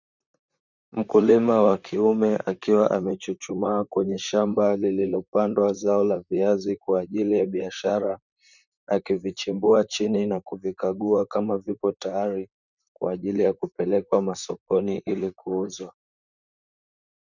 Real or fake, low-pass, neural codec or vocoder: fake; 7.2 kHz; vocoder, 44.1 kHz, 128 mel bands, Pupu-Vocoder